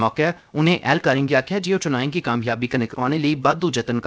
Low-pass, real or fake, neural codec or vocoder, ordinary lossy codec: none; fake; codec, 16 kHz, 0.7 kbps, FocalCodec; none